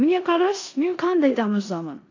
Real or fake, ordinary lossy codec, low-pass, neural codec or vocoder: fake; AAC, 48 kbps; 7.2 kHz; codec, 16 kHz in and 24 kHz out, 0.9 kbps, LongCat-Audio-Codec, four codebook decoder